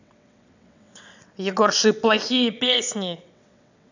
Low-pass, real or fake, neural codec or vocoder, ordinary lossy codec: 7.2 kHz; fake; vocoder, 22.05 kHz, 80 mel bands, WaveNeXt; none